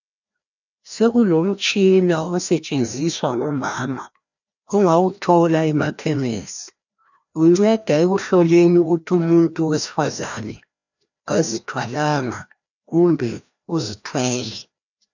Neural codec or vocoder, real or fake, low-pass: codec, 16 kHz, 1 kbps, FreqCodec, larger model; fake; 7.2 kHz